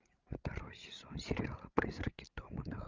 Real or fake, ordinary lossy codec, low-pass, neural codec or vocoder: fake; Opus, 24 kbps; 7.2 kHz; codec, 16 kHz, 16 kbps, FreqCodec, larger model